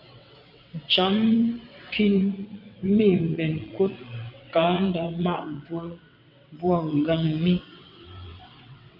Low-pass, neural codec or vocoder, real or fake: 5.4 kHz; vocoder, 22.05 kHz, 80 mel bands, WaveNeXt; fake